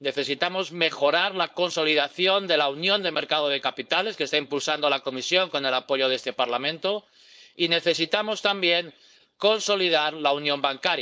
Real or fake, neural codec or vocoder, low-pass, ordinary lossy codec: fake; codec, 16 kHz, 4.8 kbps, FACodec; none; none